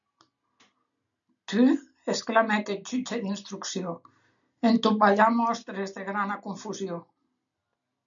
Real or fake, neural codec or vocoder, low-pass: real; none; 7.2 kHz